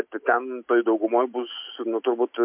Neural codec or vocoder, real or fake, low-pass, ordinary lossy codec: none; real; 3.6 kHz; MP3, 32 kbps